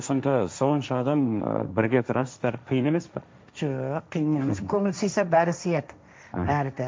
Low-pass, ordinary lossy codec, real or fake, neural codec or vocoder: none; none; fake; codec, 16 kHz, 1.1 kbps, Voila-Tokenizer